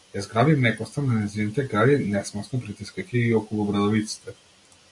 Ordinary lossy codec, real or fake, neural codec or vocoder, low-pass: AAC, 64 kbps; real; none; 10.8 kHz